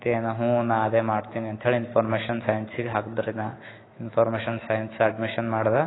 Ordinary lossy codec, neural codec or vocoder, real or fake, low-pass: AAC, 16 kbps; autoencoder, 48 kHz, 128 numbers a frame, DAC-VAE, trained on Japanese speech; fake; 7.2 kHz